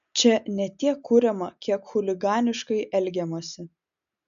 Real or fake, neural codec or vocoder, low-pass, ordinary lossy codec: real; none; 7.2 kHz; AAC, 96 kbps